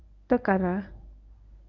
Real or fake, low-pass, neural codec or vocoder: fake; 7.2 kHz; codec, 24 kHz, 0.9 kbps, WavTokenizer, medium speech release version 1